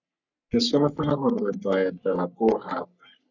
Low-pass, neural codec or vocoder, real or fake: 7.2 kHz; codec, 44.1 kHz, 3.4 kbps, Pupu-Codec; fake